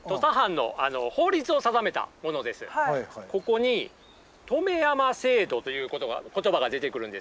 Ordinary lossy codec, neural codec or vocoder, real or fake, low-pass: none; none; real; none